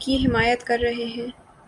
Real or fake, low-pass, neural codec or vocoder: real; 10.8 kHz; none